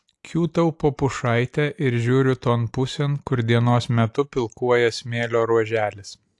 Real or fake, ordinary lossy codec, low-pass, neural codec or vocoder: real; AAC, 64 kbps; 10.8 kHz; none